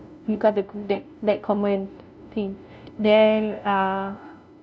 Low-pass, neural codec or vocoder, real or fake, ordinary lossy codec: none; codec, 16 kHz, 0.5 kbps, FunCodec, trained on LibriTTS, 25 frames a second; fake; none